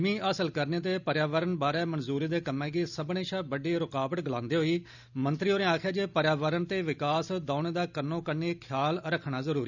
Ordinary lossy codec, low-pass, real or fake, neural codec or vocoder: none; 7.2 kHz; real; none